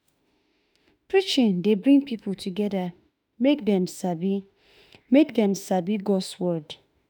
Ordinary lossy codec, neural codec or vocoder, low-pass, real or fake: none; autoencoder, 48 kHz, 32 numbers a frame, DAC-VAE, trained on Japanese speech; none; fake